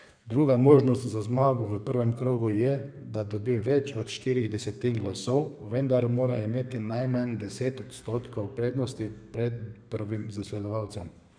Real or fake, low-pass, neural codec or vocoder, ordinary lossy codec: fake; 9.9 kHz; codec, 32 kHz, 1.9 kbps, SNAC; none